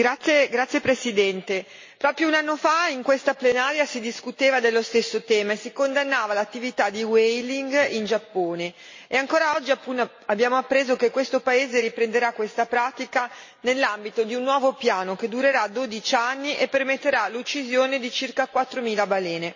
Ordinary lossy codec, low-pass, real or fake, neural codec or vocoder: MP3, 32 kbps; 7.2 kHz; real; none